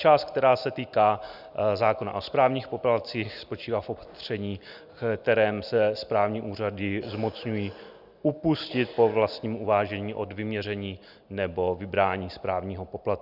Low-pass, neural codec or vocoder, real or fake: 5.4 kHz; none; real